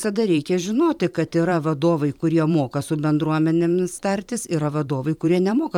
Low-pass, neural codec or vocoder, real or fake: 19.8 kHz; vocoder, 44.1 kHz, 128 mel bands every 512 samples, BigVGAN v2; fake